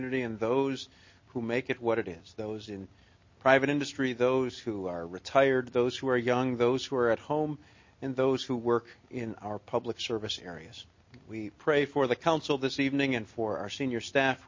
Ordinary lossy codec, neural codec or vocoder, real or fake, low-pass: MP3, 32 kbps; none; real; 7.2 kHz